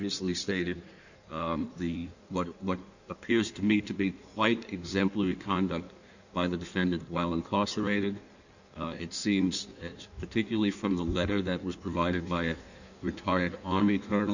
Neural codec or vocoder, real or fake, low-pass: codec, 16 kHz in and 24 kHz out, 1.1 kbps, FireRedTTS-2 codec; fake; 7.2 kHz